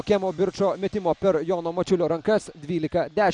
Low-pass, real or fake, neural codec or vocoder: 9.9 kHz; real; none